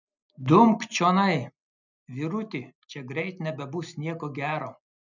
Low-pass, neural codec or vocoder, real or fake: 7.2 kHz; none; real